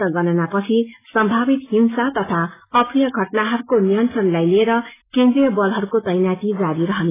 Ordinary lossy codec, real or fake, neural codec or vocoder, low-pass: AAC, 16 kbps; real; none; 3.6 kHz